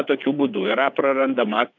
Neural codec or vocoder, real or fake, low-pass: vocoder, 22.05 kHz, 80 mel bands, WaveNeXt; fake; 7.2 kHz